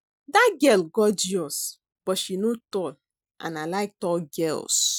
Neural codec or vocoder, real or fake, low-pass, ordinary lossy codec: none; real; none; none